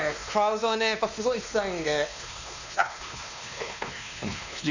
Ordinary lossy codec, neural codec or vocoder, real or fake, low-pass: none; codec, 16 kHz, 2 kbps, X-Codec, WavLM features, trained on Multilingual LibriSpeech; fake; 7.2 kHz